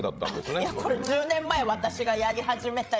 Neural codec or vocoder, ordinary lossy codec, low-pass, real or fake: codec, 16 kHz, 16 kbps, FreqCodec, larger model; none; none; fake